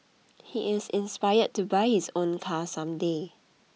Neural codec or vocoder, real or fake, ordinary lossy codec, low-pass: none; real; none; none